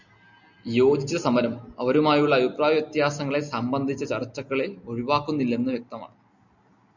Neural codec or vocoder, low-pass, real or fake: none; 7.2 kHz; real